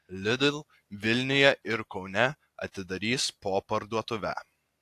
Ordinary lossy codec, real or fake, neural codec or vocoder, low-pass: AAC, 64 kbps; real; none; 14.4 kHz